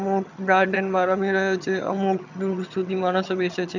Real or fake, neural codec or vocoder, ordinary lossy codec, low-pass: fake; vocoder, 22.05 kHz, 80 mel bands, HiFi-GAN; none; 7.2 kHz